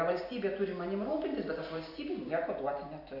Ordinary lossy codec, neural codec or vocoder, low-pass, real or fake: AAC, 32 kbps; none; 5.4 kHz; real